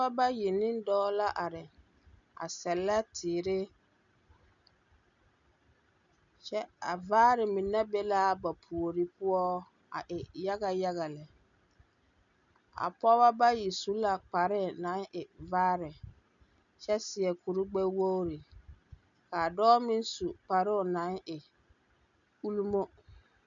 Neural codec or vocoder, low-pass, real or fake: none; 7.2 kHz; real